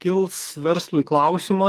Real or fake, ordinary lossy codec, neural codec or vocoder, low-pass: fake; Opus, 32 kbps; codec, 44.1 kHz, 2.6 kbps, SNAC; 14.4 kHz